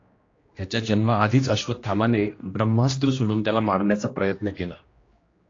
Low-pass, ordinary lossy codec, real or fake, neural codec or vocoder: 7.2 kHz; AAC, 32 kbps; fake; codec, 16 kHz, 1 kbps, X-Codec, HuBERT features, trained on general audio